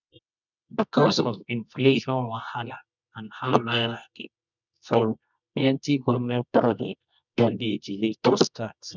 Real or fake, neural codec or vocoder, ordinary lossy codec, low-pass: fake; codec, 24 kHz, 0.9 kbps, WavTokenizer, medium music audio release; none; 7.2 kHz